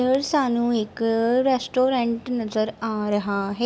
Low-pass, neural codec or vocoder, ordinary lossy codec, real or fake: none; none; none; real